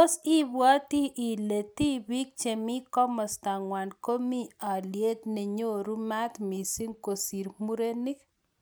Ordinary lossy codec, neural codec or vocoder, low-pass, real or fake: none; none; none; real